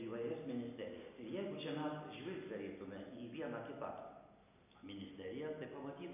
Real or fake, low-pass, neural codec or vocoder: real; 3.6 kHz; none